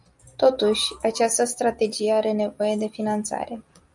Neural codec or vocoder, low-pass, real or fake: none; 10.8 kHz; real